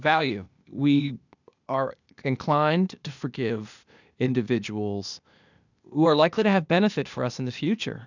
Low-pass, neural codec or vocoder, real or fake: 7.2 kHz; codec, 16 kHz, 0.8 kbps, ZipCodec; fake